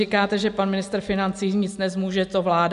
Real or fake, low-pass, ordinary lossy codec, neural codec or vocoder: real; 10.8 kHz; MP3, 64 kbps; none